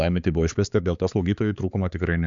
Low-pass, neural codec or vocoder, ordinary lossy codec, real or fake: 7.2 kHz; codec, 16 kHz, 4 kbps, X-Codec, HuBERT features, trained on balanced general audio; AAC, 64 kbps; fake